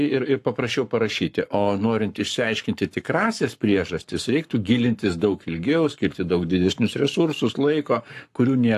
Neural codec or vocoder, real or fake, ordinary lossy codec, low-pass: codec, 44.1 kHz, 7.8 kbps, Pupu-Codec; fake; AAC, 64 kbps; 14.4 kHz